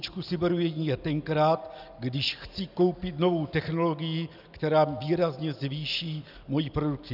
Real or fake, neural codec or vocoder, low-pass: real; none; 5.4 kHz